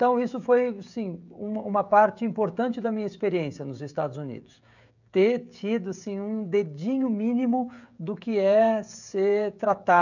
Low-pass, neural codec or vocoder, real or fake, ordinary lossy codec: 7.2 kHz; codec, 16 kHz, 16 kbps, FreqCodec, smaller model; fake; none